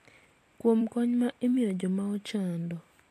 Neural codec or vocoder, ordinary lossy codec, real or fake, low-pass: vocoder, 44.1 kHz, 128 mel bands every 256 samples, BigVGAN v2; AAC, 64 kbps; fake; 14.4 kHz